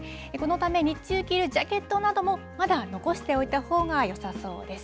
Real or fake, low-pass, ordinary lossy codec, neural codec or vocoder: real; none; none; none